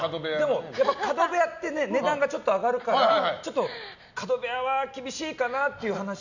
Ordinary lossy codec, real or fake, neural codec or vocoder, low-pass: none; real; none; 7.2 kHz